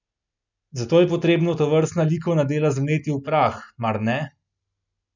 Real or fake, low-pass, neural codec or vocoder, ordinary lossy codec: real; 7.2 kHz; none; none